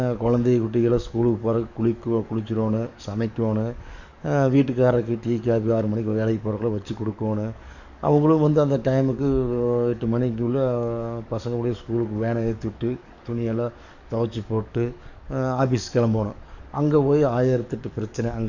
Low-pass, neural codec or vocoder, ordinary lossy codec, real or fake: 7.2 kHz; codec, 44.1 kHz, 7.8 kbps, DAC; none; fake